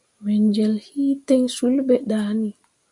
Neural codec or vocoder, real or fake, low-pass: none; real; 10.8 kHz